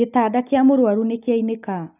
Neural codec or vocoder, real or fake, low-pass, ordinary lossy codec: none; real; 3.6 kHz; none